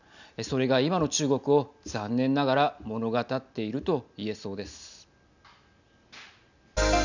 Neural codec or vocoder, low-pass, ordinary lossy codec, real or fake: none; 7.2 kHz; none; real